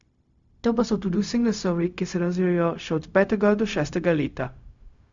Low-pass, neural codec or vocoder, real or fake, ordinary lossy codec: 7.2 kHz; codec, 16 kHz, 0.4 kbps, LongCat-Audio-Codec; fake; Opus, 64 kbps